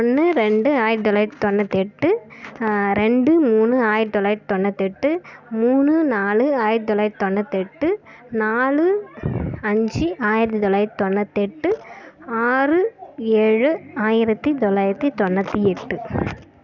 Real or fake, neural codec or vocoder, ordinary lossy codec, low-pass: real; none; none; 7.2 kHz